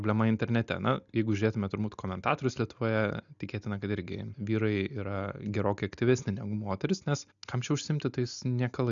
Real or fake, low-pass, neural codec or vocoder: real; 7.2 kHz; none